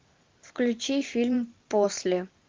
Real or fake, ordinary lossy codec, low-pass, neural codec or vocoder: fake; Opus, 32 kbps; 7.2 kHz; vocoder, 44.1 kHz, 128 mel bands every 512 samples, BigVGAN v2